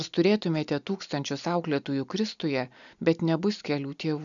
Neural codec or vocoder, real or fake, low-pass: none; real; 7.2 kHz